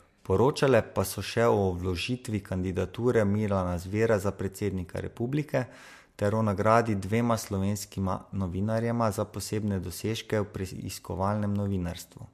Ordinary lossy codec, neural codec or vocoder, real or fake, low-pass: MP3, 64 kbps; none; real; 14.4 kHz